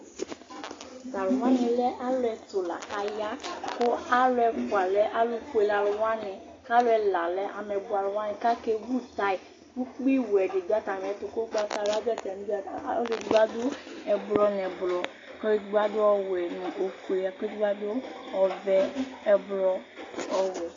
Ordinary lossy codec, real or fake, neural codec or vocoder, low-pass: AAC, 32 kbps; real; none; 7.2 kHz